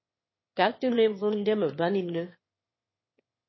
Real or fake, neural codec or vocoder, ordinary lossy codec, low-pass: fake; autoencoder, 22.05 kHz, a latent of 192 numbers a frame, VITS, trained on one speaker; MP3, 24 kbps; 7.2 kHz